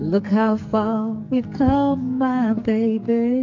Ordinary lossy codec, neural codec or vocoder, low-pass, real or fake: MP3, 64 kbps; codec, 44.1 kHz, 2.6 kbps, SNAC; 7.2 kHz; fake